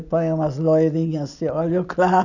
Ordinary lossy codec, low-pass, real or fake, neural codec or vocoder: none; 7.2 kHz; real; none